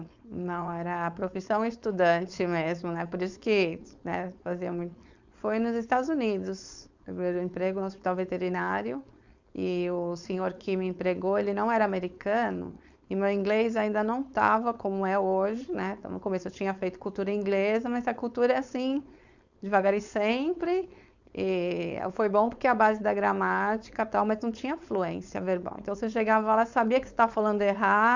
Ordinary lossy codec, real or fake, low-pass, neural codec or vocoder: Opus, 64 kbps; fake; 7.2 kHz; codec, 16 kHz, 4.8 kbps, FACodec